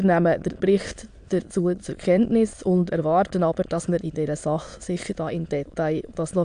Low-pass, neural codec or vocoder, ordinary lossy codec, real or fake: 9.9 kHz; autoencoder, 22.05 kHz, a latent of 192 numbers a frame, VITS, trained on many speakers; AAC, 64 kbps; fake